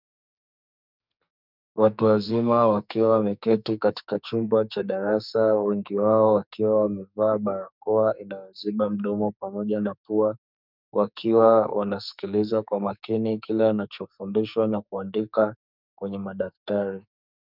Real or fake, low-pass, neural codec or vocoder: fake; 5.4 kHz; codec, 44.1 kHz, 2.6 kbps, SNAC